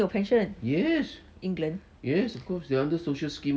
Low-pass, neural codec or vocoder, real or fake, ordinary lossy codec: none; none; real; none